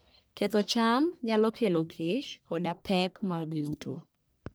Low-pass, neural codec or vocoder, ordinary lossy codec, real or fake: none; codec, 44.1 kHz, 1.7 kbps, Pupu-Codec; none; fake